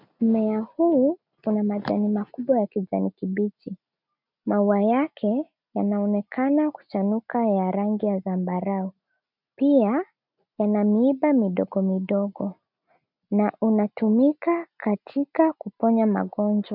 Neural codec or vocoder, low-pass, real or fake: none; 5.4 kHz; real